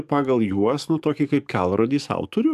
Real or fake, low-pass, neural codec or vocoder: fake; 14.4 kHz; codec, 44.1 kHz, 7.8 kbps, DAC